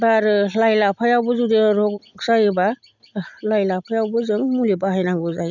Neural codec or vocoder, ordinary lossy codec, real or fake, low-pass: none; none; real; 7.2 kHz